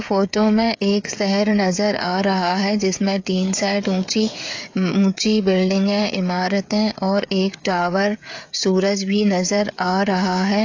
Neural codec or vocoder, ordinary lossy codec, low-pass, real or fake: codec, 16 kHz, 16 kbps, FreqCodec, smaller model; AAC, 48 kbps; 7.2 kHz; fake